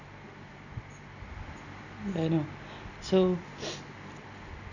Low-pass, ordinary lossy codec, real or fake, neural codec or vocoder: 7.2 kHz; none; real; none